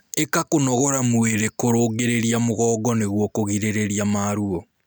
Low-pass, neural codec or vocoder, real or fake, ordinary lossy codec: none; none; real; none